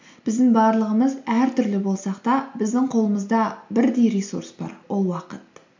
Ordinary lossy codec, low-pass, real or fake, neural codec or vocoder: MP3, 64 kbps; 7.2 kHz; real; none